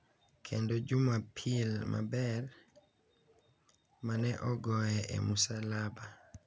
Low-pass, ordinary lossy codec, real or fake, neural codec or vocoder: none; none; real; none